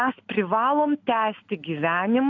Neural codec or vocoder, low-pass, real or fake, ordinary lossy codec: none; 7.2 kHz; real; AAC, 48 kbps